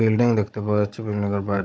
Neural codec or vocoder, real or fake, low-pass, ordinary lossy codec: codec, 16 kHz, 16 kbps, FunCodec, trained on Chinese and English, 50 frames a second; fake; none; none